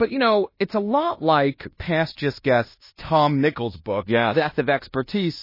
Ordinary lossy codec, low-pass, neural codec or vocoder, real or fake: MP3, 24 kbps; 5.4 kHz; codec, 16 kHz in and 24 kHz out, 0.9 kbps, LongCat-Audio-Codec, fine tuned four codebook decoder; fake